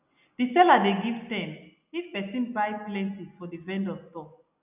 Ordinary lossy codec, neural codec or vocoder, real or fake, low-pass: none; none; real; 3.6 kHz